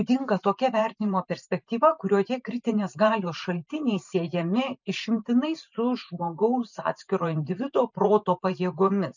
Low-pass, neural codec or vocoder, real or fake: 7.2 kHz; vocoder, 44.1 kHz, 128 mel bands every 512 samples, BigVGAN v2; fake